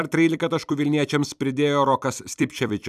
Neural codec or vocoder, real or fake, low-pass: vocoder, 44.1 kHz, 128 mel bands every 256 samples, BigVGAN v2; fake; 14.4 kHz